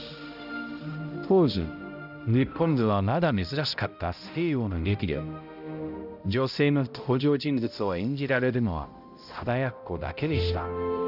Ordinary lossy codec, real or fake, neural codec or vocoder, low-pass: none; fake; codec, 16 kHz, 0.5 kbps, X-Codec, HuBERT features, trained on balanced general audio; 5.4 kHz